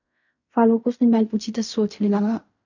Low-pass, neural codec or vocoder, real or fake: 7.2 kHz; codec, 16 kHz in and 24 kHz out, 0.4 kbps, LongCat-Audio-Codec, fine tuned four codebook decoder; fake